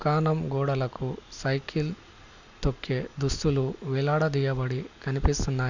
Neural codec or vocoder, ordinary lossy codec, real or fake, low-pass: none; none; real; 7.2 kHz